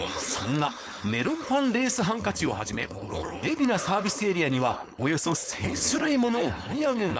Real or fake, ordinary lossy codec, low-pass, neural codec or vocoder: fake; none; none; codec, 16 kHz, 4.8 kbps, FACodec